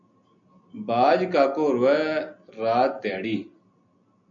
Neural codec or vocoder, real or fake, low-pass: none; real; 7.2 kHz